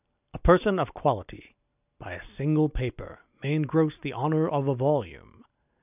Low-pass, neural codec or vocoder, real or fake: 3.6 kHz; none; real